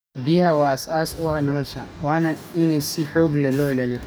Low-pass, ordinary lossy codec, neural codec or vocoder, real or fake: none; none; codec, 44.1 kHz, 2.6 kbps, DAC; fake